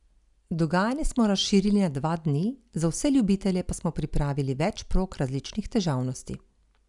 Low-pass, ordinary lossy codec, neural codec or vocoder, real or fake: 10.8 kHz; none; none; real